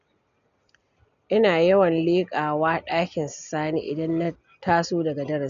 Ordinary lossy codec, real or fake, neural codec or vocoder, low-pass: none; real; none; 7.2 kHz